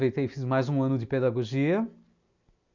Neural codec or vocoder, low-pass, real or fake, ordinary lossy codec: none; 7.2 kHz; real; none